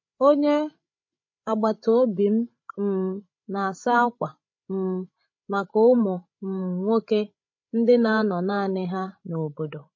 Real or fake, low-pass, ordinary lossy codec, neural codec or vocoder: fake; 7.2 kHz; MP3, 32 kbps; codec, 16 kHz, 16 kbps, FreqCodec, larger model